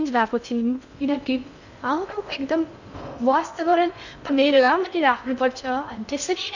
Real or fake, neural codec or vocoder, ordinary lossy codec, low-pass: fake; codec, 16 kHz in and 24 kHz out, 0.6 kbps, FocalCodec, streaming, 2048 codes; none; 7.2 kHz